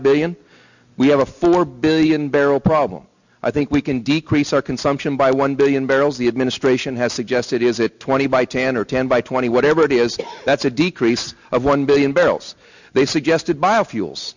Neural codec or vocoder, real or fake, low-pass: none; real; 7.2 kHz